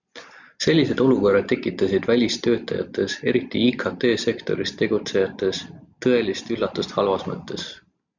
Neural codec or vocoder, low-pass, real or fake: none; 7.2 kHz; real